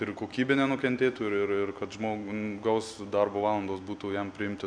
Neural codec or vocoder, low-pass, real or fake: none; 9.9 kHz; real